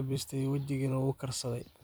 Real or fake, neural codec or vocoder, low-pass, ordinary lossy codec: fake; vocoder, 44.1 kHz, 128 mel bands every 256 samples, BigVGAN v2; none; none